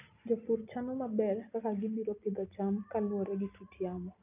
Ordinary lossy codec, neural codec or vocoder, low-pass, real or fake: none; none; 3.6 kHz; real